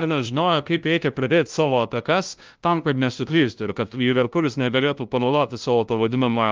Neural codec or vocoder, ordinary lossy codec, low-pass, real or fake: codec, 16 kHz, 0.5 kbps, FunCodec, trained on LibriTTS, 25 frames a second; Opus, 24 kbps; 7.2 kHz; fake